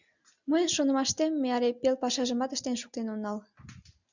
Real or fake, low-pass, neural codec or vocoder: real; 7.2 kHz; none